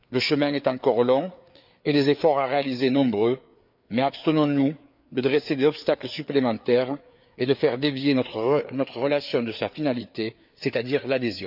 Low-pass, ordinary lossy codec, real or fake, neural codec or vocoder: 5.4 kHz; none; fake; codec, 16 kHz, 4 kbps, FreqCodec, larger model